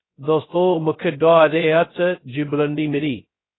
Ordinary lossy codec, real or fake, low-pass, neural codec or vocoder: AAC, 16 kbps; fake; 7.2 kHz; codec, 16 kHz, 0.2 kbps, FocalCodec